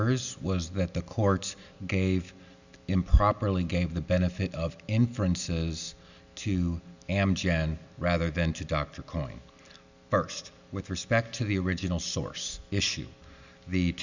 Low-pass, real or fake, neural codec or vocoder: 7.2 kHz; real; none